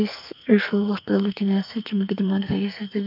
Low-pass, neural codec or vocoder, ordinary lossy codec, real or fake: 5.4 kHz; codec, 44.1 kHz, 2.6 kbps, SNAC; none; fake